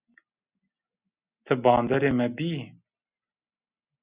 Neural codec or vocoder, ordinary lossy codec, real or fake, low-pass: none; Opus, 64 kbps; real; 3.6 kHz